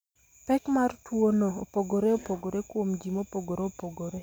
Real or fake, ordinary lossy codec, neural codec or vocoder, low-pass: real; none; none; none